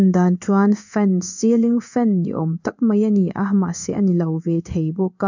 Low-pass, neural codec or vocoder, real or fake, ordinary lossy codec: 7.2 kHz; codec, 16 kHz in and 24 kHz out, 1 kbps, XY-Tokenizer; fake; none